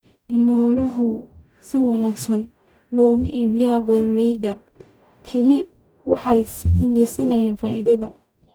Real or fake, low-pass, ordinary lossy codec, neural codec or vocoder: fake; none; none; codec, 44.1 kHz, 0.9 kbps, DAC